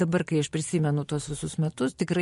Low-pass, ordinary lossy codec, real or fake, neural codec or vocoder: 14.4 kHz; MP3, 48 kbps; real; none